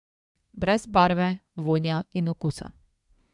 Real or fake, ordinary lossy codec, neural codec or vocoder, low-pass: fake; none; codec, 24 kHz, 1 kbps, SNAC; 10.8 kHz